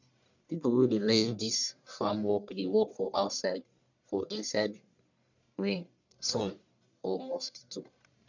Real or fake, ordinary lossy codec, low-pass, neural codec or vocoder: fake; none; 7.2 kHz; codec, 44.1 kHz, 1.7 kbps, Pupu-Codec